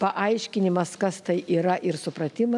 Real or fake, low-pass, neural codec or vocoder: real; 10.8 kHz; none